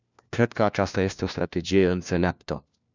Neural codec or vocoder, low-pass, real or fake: codec, 16 kHz, 1 kbps, FunCodec, trained on LibriTTS, 50 frames a second; 7.2 kHz; fake